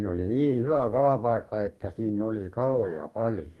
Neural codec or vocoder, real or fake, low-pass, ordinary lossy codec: codec, 44.1 kHz, 2.6 kbps, DAC; fake; 19.8 kHz; Opus, 32 kbps